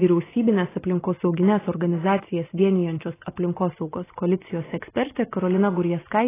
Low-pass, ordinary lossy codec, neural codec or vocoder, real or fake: 3.6 kHz; AAC, 16 kbps; none; real